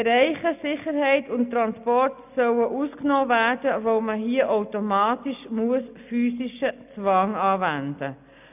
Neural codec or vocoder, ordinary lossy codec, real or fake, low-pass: none; none; real; 3.6 kHz